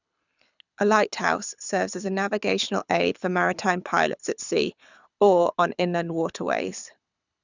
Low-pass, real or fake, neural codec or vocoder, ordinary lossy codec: 7.2 kHz; fake; codec, 24 kHz, 6 kbps, HILCodec; none